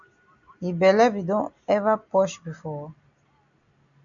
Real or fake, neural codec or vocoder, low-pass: real; none; 7.2 kHz